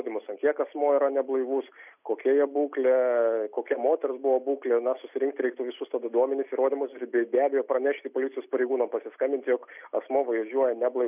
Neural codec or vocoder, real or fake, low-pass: none; real; 3.6 kHz